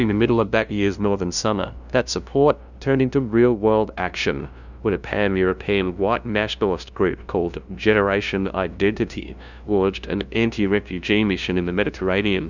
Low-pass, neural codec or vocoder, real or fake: 7.2 kHz; codec, 16 kHz, 0.5 kbps, FunCodec, trained on LibriTTS, 25 frames a second; fake